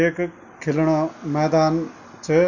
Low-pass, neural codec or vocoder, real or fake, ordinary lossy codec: 7.2 kHz; none; real; none